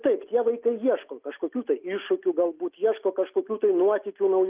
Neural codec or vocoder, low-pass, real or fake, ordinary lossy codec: none; 3.6 kHz; real; Opus, 24 kbps